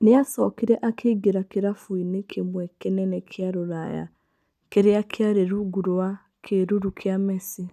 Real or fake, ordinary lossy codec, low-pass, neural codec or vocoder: real; none; 14.4 kHz; none